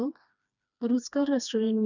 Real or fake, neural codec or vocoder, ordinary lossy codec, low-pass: fake; codec, 16 kHz, 2 kbps, FreqCodec, smaller model; none; 7.2 kHz